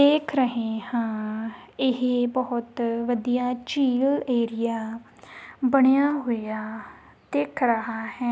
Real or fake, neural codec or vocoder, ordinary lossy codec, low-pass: real; none; none; none